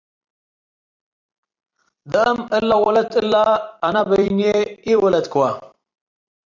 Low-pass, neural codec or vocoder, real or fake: 7.2 kHz; vocoder, 44.1 kHz, 128 mel bands every 256 samples, BigVGAN v2; fake